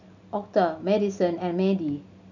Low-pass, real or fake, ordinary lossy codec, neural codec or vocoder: 7.2 kHz; real; none; none